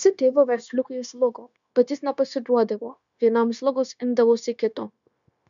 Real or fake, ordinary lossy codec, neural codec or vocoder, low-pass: fake; MP3, 96 kbps; codec, 16 kHz, 0.9 kbps, LongCat-Audio-Codec; 7.2 kHz